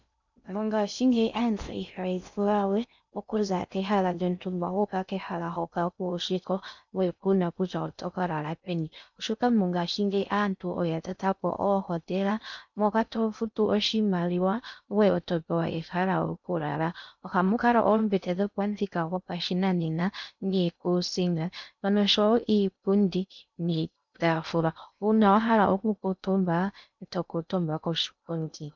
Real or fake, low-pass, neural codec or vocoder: fake; 7.2 kHz; codec, 16 kHz in and 24 kHz out, 0.6 kbps, FocalCodec, streaming, 2048 codes